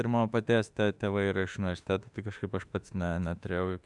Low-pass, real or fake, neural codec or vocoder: 10.8 kHz; fake; autoencoder, 48 kHz, 32 numbers a frame, DAC-VAE, trained on Japanese speech